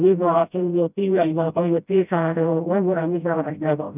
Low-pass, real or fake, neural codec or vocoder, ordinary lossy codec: 3.6 kHz; fake; codec, 16 kHz, 0.5 kbps, FreqCodec, smaller model; none